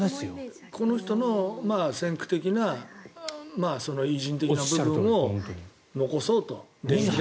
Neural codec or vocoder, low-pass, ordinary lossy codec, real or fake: none; none; none; real